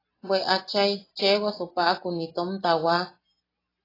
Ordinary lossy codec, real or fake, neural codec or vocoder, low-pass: AAC, 24 kbps; real; none; 5.4 kHz